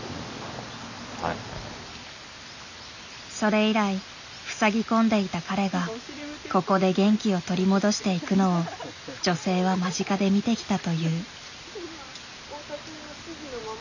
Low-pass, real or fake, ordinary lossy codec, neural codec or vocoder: 7.2 kHz; real; none; none